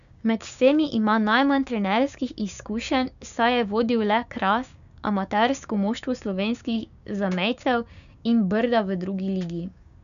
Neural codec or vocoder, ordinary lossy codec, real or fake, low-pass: codec, 16 kHz, 6 kbps, DAC; none; fake; 7.2 kHz